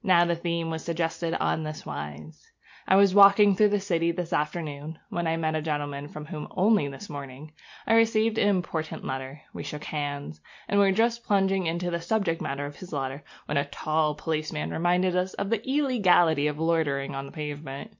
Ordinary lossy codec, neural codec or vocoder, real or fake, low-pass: MP3, 48 kbps; none; real; 7.2 kHz